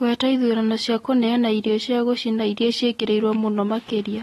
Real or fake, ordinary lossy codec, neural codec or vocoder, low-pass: real; AAC, 32 kbps; none; 19.8 kHz